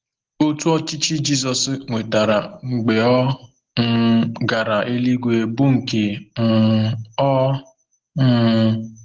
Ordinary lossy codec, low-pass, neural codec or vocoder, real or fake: Opus, 16 kbps; 7.2 kHz; none; real